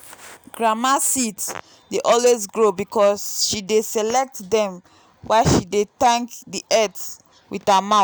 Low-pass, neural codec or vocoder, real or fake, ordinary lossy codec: none; none; real; none